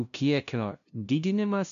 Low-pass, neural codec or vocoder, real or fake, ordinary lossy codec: 7.2 kHz; codec, 16 kHz, 0.5 kbps, FunCodec, trained on LibriTTS, 25 frames a second; fake; MP3, 96 kbps